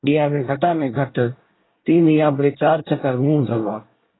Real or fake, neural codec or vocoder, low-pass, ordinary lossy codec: fake; codec, 24 kHz, 1 kbps, SNAC; 7.2 kHz; AAC, 16 kbps